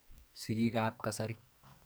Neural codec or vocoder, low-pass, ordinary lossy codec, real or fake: codec, 44.1 kHz, 2.6 kbps, SNAC; none; none; fake